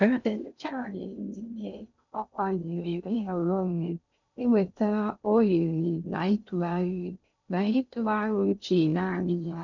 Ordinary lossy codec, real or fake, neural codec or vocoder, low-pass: none; fake; codec, 16 kHz in and 24 kHz out, 0.6 kbps, FocalCodec, streaming, 2048 codes; 7.2 kHz